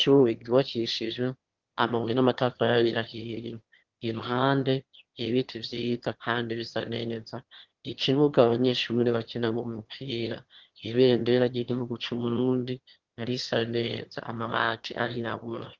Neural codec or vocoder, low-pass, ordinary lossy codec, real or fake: autoencoder, 22.05 kHz, a latent of 192 numbers a frame, VITS, trained on one speaker; 7.2 kHz; Opus, 16 kbps; fake